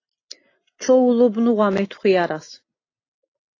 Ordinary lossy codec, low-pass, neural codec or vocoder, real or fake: AAC, 32 kbps; 7.2 kHz; none; real